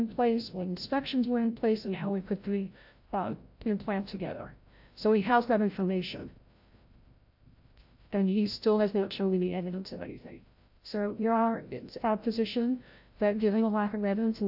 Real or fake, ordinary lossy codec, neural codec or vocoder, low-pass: fake; AAC, 48 kbps; codec, 16 kHz, 0.5 kbps, FreqCodec, larger model; 5.4 kHz